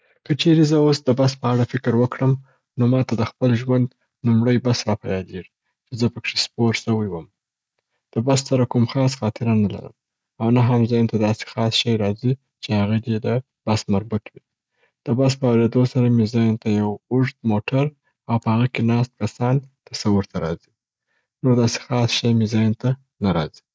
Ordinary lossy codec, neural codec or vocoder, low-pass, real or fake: none; none; none; real